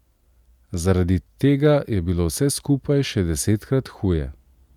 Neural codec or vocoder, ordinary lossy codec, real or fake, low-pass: none; none; real; 19.8 kHz